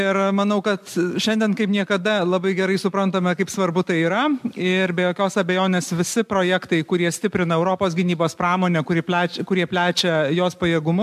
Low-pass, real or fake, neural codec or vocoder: 14.4 kHz; real; none